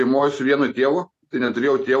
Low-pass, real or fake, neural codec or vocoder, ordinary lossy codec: 14.4 kHz; real; none; AAC, 64 kbps